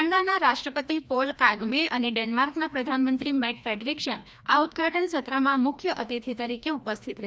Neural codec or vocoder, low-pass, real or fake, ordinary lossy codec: codec, 16 kHz, 1 kbps, FreqCodec, larger model; none; fake; none